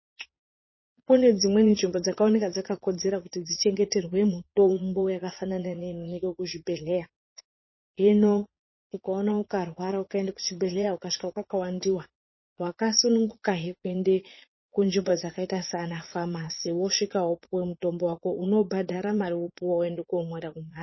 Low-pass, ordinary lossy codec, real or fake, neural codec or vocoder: 7.2 kHz; MP3, 24 kbps; fake; vocoder, 22.05 kHz, 80 mel bands, Vocos